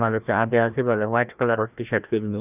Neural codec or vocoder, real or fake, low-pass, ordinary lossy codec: codec, 16 kHz, 1 kbps, FreqCodec, larger model; fake; 3.6 kHz; none